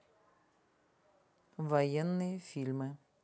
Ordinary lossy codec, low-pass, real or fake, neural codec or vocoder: none; none; real; none